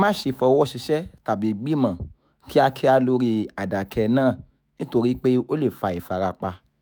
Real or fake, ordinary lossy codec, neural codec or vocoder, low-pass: fake; none; autoencoder, 48 kHz, 128 numbers a frame, DAC-VAE, trained on Japanese speech; none